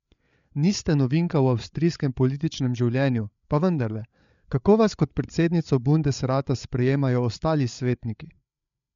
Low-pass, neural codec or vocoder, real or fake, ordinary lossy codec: 7.2 kHz; codec, 16 kHz, 8 kbps, FreqCodec, larger model; fake; MP3, 64 kbps